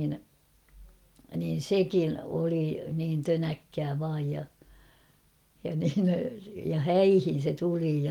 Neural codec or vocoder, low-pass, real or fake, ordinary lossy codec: none; 19.8 kHz; real; Opus, 24 kbps